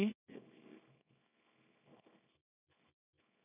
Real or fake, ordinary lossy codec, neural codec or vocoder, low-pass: fake; none; codec, 24 kHz, 0.9 kbps, WavTokenizer, small release; 3.6 kHz